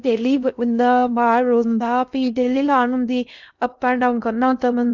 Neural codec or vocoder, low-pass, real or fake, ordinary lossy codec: codec, 16 kHz in and 24 kHz out, 0.6 kbps, FocalCodec, streaming, 2048 codes; 7.2 kHz; fake; none